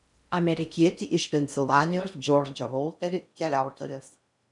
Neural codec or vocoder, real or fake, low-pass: codec, 16 kHz in and 24 kHz out, 0.6 kbps, FocalCodec, streaming, 4096 codes; fake; 10.8 kHz